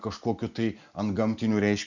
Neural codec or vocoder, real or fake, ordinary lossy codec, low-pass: none; real; Opus, 64 kbps; 7.2 kHz